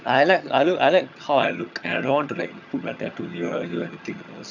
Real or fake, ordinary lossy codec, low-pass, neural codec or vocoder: fake; none; 7.2 kHz; vocoder, 22.05 kHz, 80 mel bands, HiFi-GAN